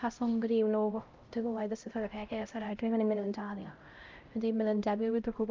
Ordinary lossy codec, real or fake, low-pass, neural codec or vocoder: Opus, 24 kbps; fake; 7.2 kHz; codec, 16 kHz, 0.5 kbps, X-Codec, HuBERT features, trained on LibriSpeech